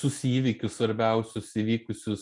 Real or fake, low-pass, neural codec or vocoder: real; 10.8 kHz; none